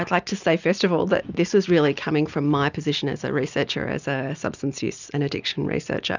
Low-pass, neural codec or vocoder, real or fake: 7.2 kHz; none; real